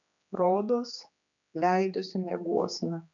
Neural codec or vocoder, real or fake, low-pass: codec, 16 kHz, 2 kbps, X-Codec, HuBERT features, trained on general audio; fake; 7.2 kHz